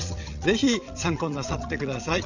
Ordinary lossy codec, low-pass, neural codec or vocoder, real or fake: none; 7.2 kHz; vocoder, 22.05 kHz, 80 mel bands, WaveNeXt; fake